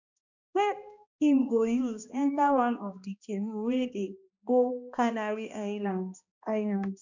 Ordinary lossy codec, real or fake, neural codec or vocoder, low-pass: none; fake; codec, 16 kHz, 1 kbps, X-Codec, HuBERT features, trained on balanced general audio; 7.2 kHz